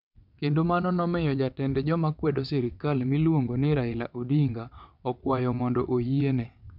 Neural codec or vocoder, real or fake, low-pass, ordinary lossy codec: vocoder, 22.05 kHz, 80 mel bands, WaveNeXt; fake; 5.4 kHz; none